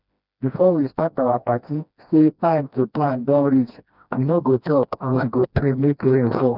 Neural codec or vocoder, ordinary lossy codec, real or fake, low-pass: codec, 16 kHz, 1 kbps, FreqCodec, smaller model; none; fake; 5.4 kHz